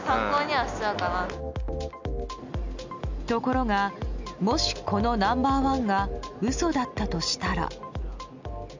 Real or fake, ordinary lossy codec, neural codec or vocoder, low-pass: real; MP3, 64 kbps; none; 7.2 kHz